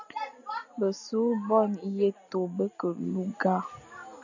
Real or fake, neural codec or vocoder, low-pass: real; none; 7.2 kHz